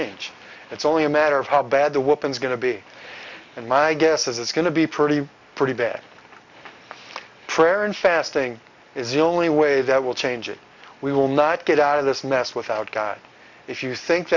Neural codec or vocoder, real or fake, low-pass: none; real; 7.2 kHz